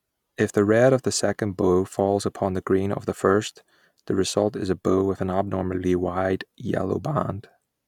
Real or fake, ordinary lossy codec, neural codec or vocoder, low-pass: real; none; none; 19.8 kHz